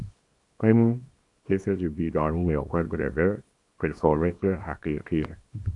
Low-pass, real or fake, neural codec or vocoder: 10.8 kHz; fake; codec, 24 kHz, 0.9 kbps, WavTokenizer, small release